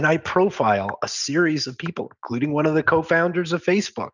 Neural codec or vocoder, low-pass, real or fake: none; 7.2 kHz; real